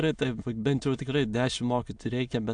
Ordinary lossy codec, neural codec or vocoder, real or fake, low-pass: AAC, 64 kbps; autoencoder, 22.05 kHz, a latent of 192 numbers a frame, VITS, trained on many speakers; fake; 9.9 kHz